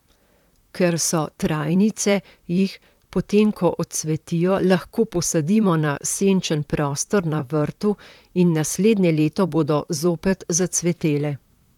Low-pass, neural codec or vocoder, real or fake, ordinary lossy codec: 19.8 kHz; vocoder, 44.1 kHz, 128 mel bands, Pupu-Vocoder; fake; none